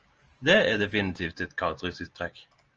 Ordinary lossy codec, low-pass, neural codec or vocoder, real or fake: Opus, 24 kbps; 7.2 kHz; none; real